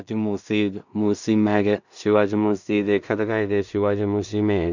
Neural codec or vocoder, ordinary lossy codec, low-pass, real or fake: codec, 16 kHz in and 24 kHz out, 0.4 kbps, LongCat-Audio-Codec, two codebook decoder; none; 7.2 kHz; fake